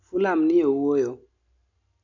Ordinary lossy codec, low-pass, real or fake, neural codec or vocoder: none; 7.2 kHz; real; none